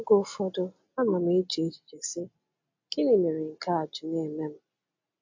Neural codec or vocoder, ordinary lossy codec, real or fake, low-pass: none; MP3, 48 kbps; real; 7.2 kHz